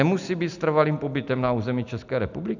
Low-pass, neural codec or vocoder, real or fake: 7.2 kHz; none; real